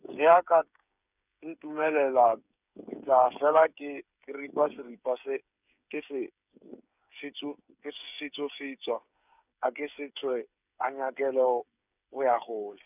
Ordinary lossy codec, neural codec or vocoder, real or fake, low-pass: none; codec, 16 kHz, 8 kbps, FreqCodec, smaller model; fake; 3.6 kHz